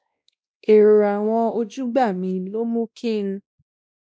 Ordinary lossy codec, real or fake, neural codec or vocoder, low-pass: none; fake; codec, 16 kHz, 1 kbps, X-Codec, WavLM features, trained on Multilingual LibriSpeech; none